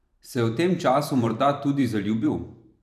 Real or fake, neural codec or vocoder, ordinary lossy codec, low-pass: fake; vocoder, 44.1 kHz, 128 mel bands every 512 samples, BigVGAN v2; none; 14.4 kHz